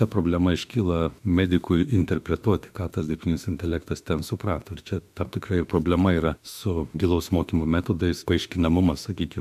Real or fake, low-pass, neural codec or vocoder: fake; 14.4 kHz; autoencoder, 48 kHz, 32 numbers a frame, DAC-VAE, trained on Japanese speech